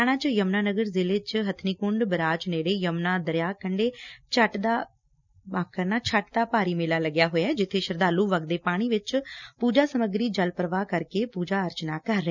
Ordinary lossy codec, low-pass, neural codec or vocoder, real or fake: none; 7.2 kHz; none; real